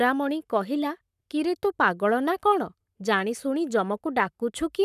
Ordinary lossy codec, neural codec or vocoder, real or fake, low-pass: none; codec, 44.1 kHz, 7.8 kbps, Pupu-Codec; fake; 14.4 kHz